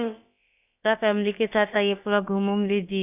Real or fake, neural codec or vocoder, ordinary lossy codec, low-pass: fake; codec, 16 kHz, about 1 kbps, DyCAST, with the encoder's durations; none; 3.6 kHz